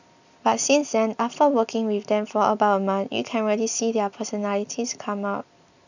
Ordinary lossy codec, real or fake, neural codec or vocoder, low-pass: none; real; none; 7.2 kHz